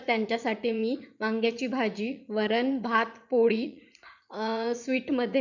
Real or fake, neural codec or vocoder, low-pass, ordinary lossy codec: fake; vocoder, 44.1 kHz, 80 mel bands, Vocos; 7.2 kHz; none